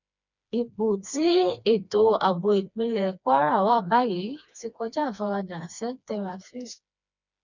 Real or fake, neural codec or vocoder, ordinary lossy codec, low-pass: fake; codec, 16 kHz, 2 kbps, FreqCodec, smaller model; none; 7.2 kHz